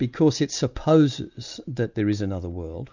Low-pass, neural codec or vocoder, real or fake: 7.2 kHz; none; real